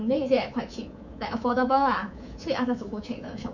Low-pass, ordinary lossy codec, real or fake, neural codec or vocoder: 7.2 kHz; none; fake; codec, 24 kHz, 3.1 kbps, DualCodec